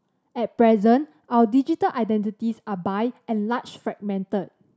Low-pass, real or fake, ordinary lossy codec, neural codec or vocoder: none; real; none; none